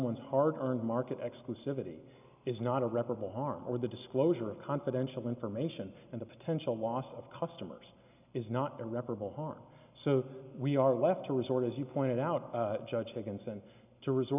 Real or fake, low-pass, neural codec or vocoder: real; 3.6 kHz; none